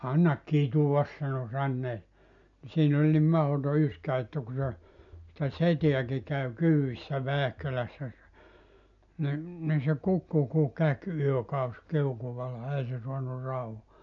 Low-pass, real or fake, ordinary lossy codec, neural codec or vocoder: 7.2 kHz; real; none; none